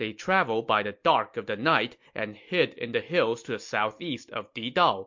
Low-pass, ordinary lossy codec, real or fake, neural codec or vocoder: 7.2 kHz; MP3, 48 kbps; real; none